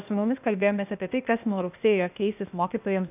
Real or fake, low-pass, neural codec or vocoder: fake; 3.6 kHz; codec, 16 kHz, 0.8 kbps, ZipCodec